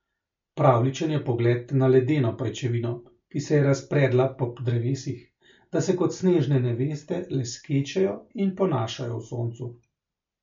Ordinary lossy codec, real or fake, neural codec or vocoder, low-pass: MP3, 48 kbps; real; none; 7.2 kHz